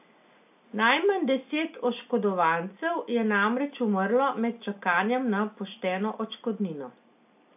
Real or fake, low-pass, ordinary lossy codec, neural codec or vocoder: real; 3.6 kHz; AAC, 32 kbps; none